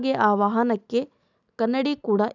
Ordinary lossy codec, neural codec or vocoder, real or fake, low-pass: none; none; real; 7.2 kHz